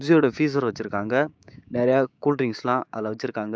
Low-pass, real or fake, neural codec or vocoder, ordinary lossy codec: none; fake; codec, 16 kHz, 6 kbps, DAC; none